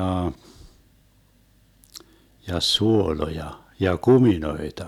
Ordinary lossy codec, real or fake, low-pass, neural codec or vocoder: none; real; 19.8 kHz; none